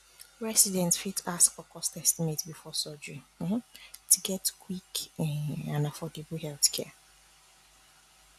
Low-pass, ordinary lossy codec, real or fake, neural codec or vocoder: 14.4 kHz; AAC, 96 kbps; real; none